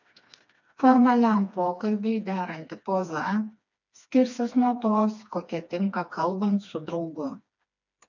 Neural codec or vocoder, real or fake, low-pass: codec, 16 kHz, 2 kbps, FreqCodec, smaller model; fake; 7.2 kHz